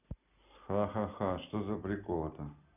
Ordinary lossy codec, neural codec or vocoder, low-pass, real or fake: none; none; 3.6 kHz; real